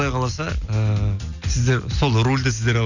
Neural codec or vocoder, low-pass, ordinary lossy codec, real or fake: none; 7.2 kHz; none; real